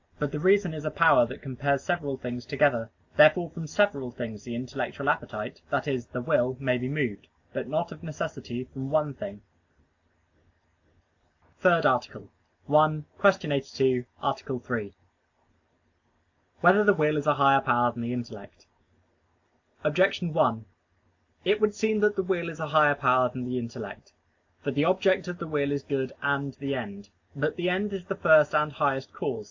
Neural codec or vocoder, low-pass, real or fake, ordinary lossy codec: none; 7.2 kHz; real; AAC, 48 kbps